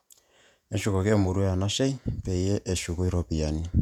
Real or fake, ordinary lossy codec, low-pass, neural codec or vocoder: fake; none; 19.8 kHz; vocoder, 48 kHz, 128 mel bands, Vocos